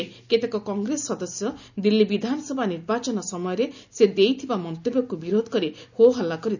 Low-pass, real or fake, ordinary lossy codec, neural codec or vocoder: 7.2 kHz; real; none; none